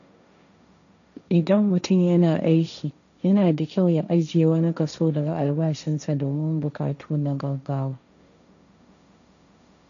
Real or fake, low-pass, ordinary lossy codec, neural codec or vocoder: fake; 7.2 kHz; none; codec, 16 kHz, 1.1 kbps, Voila-Tokenizer